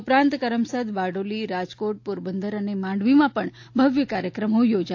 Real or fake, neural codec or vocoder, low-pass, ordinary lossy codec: real; none; 7.2 kHz; AAC, 48 kbps